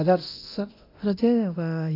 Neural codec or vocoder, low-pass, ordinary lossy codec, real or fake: codec, 24 kHz, 0.9 kbps, DualCodec; 5.4 kHz; AAC, 32 kbps; fake